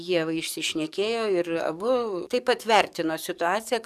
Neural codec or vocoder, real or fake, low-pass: codec, 44.1 kHz, 7.8 kbps, DAC; fake; 14.4 kHz